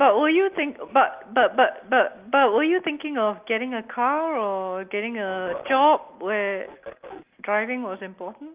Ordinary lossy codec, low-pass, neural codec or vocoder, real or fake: Opus, 32 kbps; 3.6 kHz; none; real